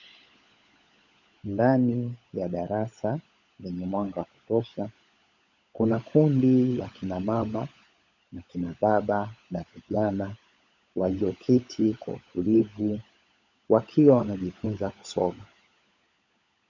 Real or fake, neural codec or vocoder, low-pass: fake; codec, 16 kHz, 16 kbps, FunCodec, trained on LibriTTS, 50 frames a second; 7.2 kHz